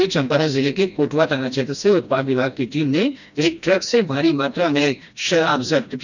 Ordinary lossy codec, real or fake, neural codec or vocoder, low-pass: none; fake; codec, 16 kHz, 1 kbps, FreqCodec, smaller model; 7.2 kHz